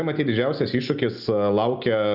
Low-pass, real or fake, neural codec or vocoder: 5.4 kHz; real; none